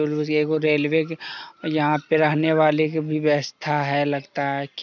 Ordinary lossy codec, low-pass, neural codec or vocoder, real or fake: none; 7.2 kHz; none; real